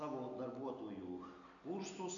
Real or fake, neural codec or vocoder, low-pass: real; none; 7.2 kHz